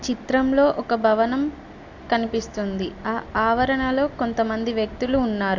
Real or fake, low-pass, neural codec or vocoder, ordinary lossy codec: real; 7.2 kHz; none; AAC, 48 kbps